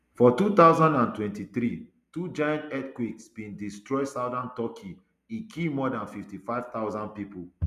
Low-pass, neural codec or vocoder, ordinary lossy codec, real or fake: 14.4 kHz; none; none; real